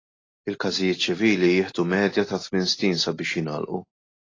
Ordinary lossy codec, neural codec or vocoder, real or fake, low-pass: AAC, 32 kbps; none; real; 7.2 kHz